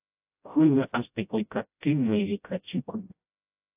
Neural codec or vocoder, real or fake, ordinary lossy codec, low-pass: codec, 16 kHz, 0.5 kbps, FreqCodec, smaller model; fake; AAC, 32 kbps; 3.6 kHz